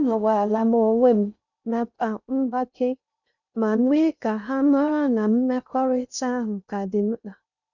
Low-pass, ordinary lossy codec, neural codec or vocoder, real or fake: 7.2 kHz; none; codec, 16 kHz in and 24 kHz out, 0.6 kbps, FocalCodec, streaming, 2048 codes; fake